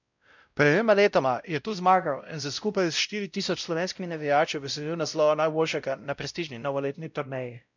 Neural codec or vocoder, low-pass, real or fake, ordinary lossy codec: codec, 16 kHz, 0.5 kbps, X-Codec, WavLM features, trained on Multilingual LibriSpeech; 7.2 kHz; fake; none